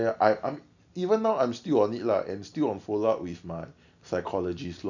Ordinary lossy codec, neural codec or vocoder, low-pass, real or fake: none; none; 7.2 kHz; real